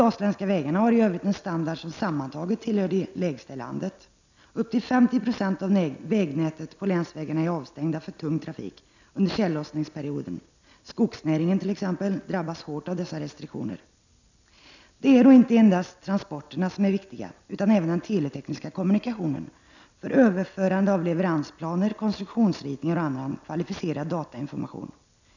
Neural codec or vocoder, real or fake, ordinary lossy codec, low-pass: none; real; none; 7.2 kHz